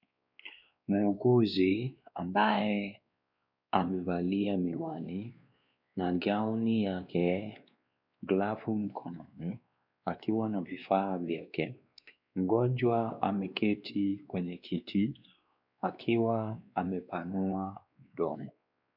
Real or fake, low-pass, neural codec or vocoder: fake; 5.4 kHz; codec, 16 kHz, 2 kbps, X-Codec, WavLM features, trained on Multilingual LibriSpeech